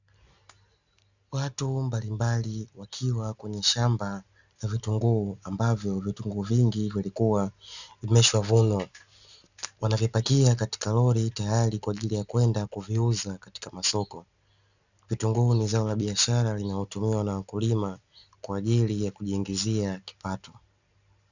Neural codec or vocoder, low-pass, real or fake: none; 7.2 kHz; real